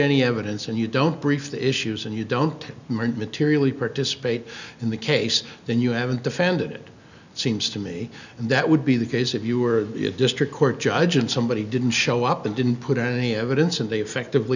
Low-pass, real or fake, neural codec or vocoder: 7.2 kHz; real; none